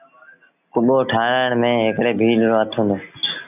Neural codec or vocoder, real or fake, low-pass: none; real; 3.6 kHz